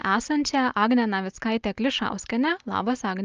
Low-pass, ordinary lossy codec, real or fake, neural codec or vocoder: 7.2 kHz; Opus, 24 kbps; real; none